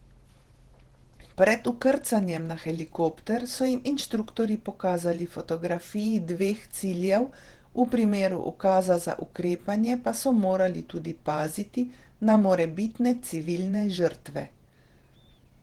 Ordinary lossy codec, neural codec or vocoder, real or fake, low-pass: Opus, 16 kbps; vocoder, 48 kHz, 128 mel bands, Vocos; fake; 19.8 kHz